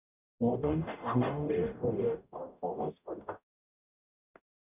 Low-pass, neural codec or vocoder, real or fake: 3.6 kHz; codec, 44.1 kHz, 0.9 kbps, DAC; fake